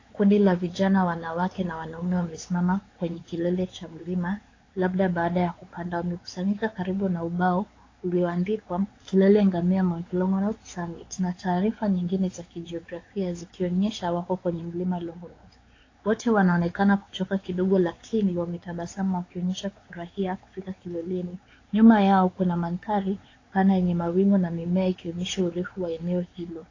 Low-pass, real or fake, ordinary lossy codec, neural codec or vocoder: 7.2 kHz; fake; AAC, 32 kbps; codec, 16 kHz, 4 kbps, X-Codec, WavLM features, trained on Multilingual LibriSpeech